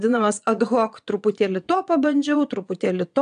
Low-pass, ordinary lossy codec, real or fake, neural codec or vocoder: 9.9 kHz; AAC, 96 kbps; fake; vocoder, 22.05 kHz, 80 mel bands, Vocos